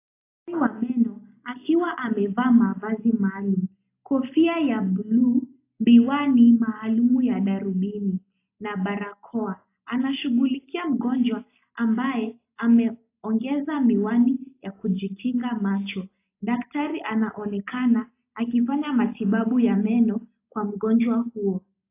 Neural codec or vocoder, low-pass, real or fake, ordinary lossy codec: none; 3.6 kHz; real; AAC, 24 kbps